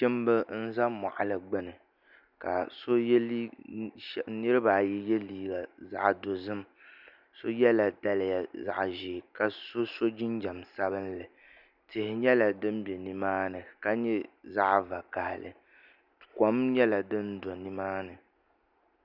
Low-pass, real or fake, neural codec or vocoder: 5.4 kHz; real; none